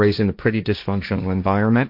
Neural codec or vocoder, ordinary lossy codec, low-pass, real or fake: codec, 16 kHz, 1.1 kbps, Voila-Tokenizer; MP3, 48 kbps; 5.4 kHz; fake